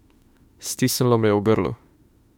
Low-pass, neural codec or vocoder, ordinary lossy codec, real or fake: 19.8 kHz; autoencoder, 48 kHz, 32 numbers a frame, DAC-VAE, trained on Japanese speech; MP3, 96 kbps; fake